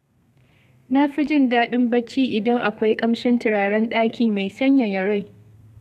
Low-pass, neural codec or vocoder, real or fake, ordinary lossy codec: 14.4 kHz; codec, 32 kHz, 1.9 kbps, SNAC; fake; none